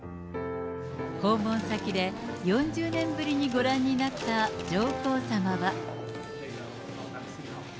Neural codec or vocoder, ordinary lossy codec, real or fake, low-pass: none; none; real; none